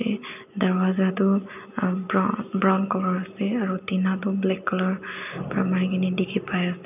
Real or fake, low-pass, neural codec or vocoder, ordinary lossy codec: real; 3.6 kHz; none; none